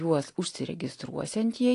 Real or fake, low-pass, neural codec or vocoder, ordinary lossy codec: real; 10.8 kHz; none; AAC, 48 kbps